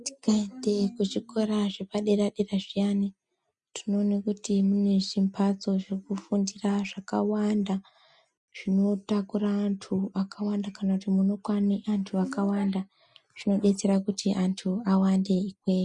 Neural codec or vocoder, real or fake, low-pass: none; real; 10.8 kHz